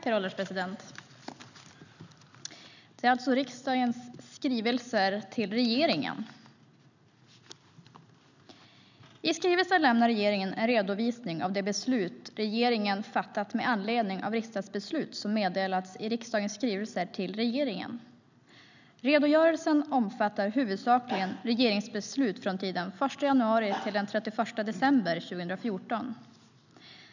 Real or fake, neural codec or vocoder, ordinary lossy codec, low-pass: real; none; none; 7.2 kHz